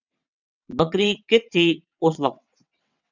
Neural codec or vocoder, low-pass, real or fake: vocoder, 22.05 kHz, 80 mel bands, WaveNeXt; 7.2 kHz; fake